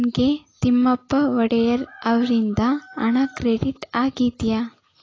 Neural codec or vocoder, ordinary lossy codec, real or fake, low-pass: none; AAC, 32 kbps; real; 7.2 kHz